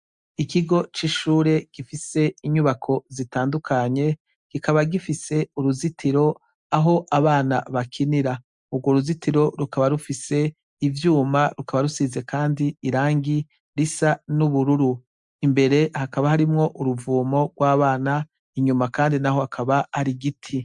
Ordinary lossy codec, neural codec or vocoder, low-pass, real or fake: MP3, 96 kbps; none; 10.8 kHz; real